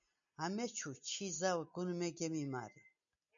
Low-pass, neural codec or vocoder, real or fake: 7.2 kHz; none; real